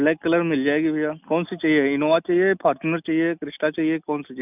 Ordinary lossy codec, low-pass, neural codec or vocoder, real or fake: none; 3.6 kHz; none; real